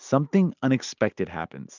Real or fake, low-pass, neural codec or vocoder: real; 7.2 kHz; none